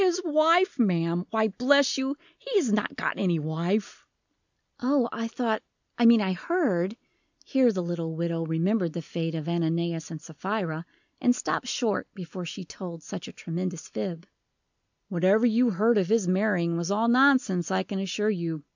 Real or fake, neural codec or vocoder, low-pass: real; none; 7.2 kHz